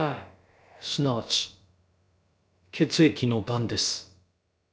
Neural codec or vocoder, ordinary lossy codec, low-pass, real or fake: codec, 16 kHz, about 1 kbps, DyCAST, with the encoder's durations; none; none; fake